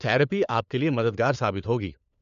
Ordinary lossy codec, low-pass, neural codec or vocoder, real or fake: none; 7.2 kHz; codec, 16 kHz, 4 kbps, FunCodec, trained on LibriTTS, 50 frames a second; fake